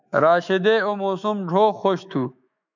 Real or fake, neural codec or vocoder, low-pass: fake; autoencoder, 48 kHz, 128 numbers a frame, DAC-VAE, trained on Japanese speech; 7.2 kHz